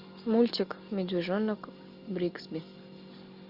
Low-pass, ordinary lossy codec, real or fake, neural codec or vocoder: 5.4 kHz; Opus, 64 kbps; real; none